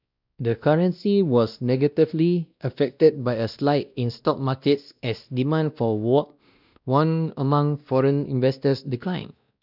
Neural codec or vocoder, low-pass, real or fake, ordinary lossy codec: codec, 16 kHz, 1 kbps, X-Codec, WavLM features, trained on Multilingual LibriSpeech; 5.4 kHz; fake; none